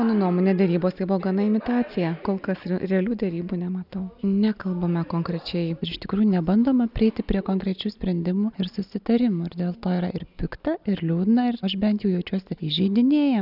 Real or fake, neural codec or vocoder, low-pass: real; none; 5.4 kHz